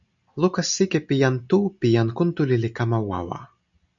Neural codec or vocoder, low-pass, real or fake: none; 7.2 kHz; real